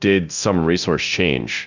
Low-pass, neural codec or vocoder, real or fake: 7.2 kHz; codec, 24 kHz, 0.9 kbps, DualCodec; fake